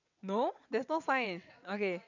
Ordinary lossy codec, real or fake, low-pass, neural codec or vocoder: none; fake; 7.2 kHz; vocoder, 22.05 kHz, 80 mel bands, WaveNeXt